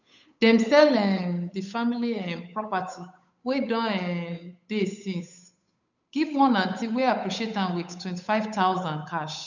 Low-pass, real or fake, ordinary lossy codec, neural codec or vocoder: 7.2 kHz; fake; none; codec, 16 kHz, 8 kbps, FunCodec, trained on Chinese and English, 25 frames a second